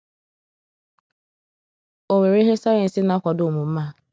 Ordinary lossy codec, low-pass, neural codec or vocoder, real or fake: none; none; none; real